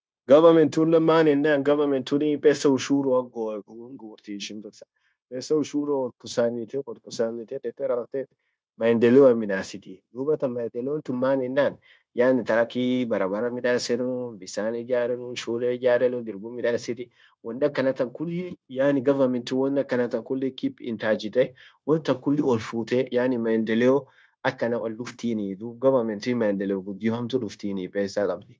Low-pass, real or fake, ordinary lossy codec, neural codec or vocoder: none; fake; none; codec, 16 kHz, 0.9 kbps, LongCat-Audio-Codec